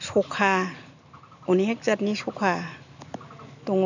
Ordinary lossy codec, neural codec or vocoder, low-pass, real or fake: none; none; 7.2 kHz; real